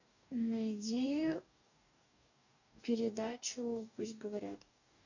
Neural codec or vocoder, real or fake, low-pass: codec, 44.1 kHz, 2.6 kbps, DAC; fake; 7.2 kHz